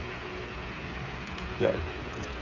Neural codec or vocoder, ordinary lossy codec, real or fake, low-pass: codec, 16 kHz, 8 kbps, FreqCodec, smaller model; none; fake; 7.2 kHz